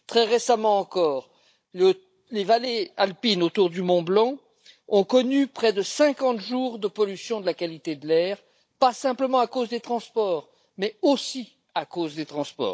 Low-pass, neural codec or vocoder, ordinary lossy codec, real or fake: none; codec, 16 kHz, 16 kbps, FunCodec, trained on Chinese and English, 50 frames a second; none; fake